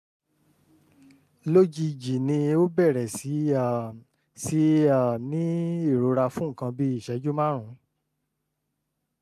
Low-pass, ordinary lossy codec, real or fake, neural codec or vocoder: 14.4 kHz; MP3, 96 kbps; real; none